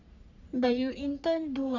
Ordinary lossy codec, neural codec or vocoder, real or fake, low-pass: none; codec, 44.1 kHz, 3.4 kbps, Pupu-Codec; fake; 7.2 kHz